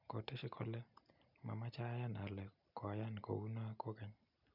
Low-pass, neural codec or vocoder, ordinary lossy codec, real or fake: 5.4 kHz; none; none; real